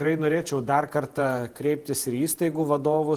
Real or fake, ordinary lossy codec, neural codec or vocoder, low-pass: fake; Opus, 24 kbps; vocoder, 48 kHz, 128 mel bands, Vocos; 14.4 kHz